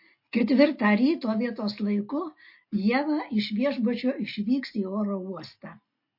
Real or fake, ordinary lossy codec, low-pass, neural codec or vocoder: real; MP3, 32 kbps; 5.4 kHz; none